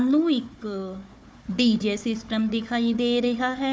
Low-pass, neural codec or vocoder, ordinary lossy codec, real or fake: none; codec, 16 kHz, 4 kbps, FunCodec, trained on Chinese and English, 50 frames a second; none; fake